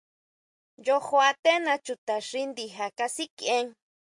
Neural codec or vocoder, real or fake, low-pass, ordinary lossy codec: none; real; 10.8 kHz; MP3, 64 kbps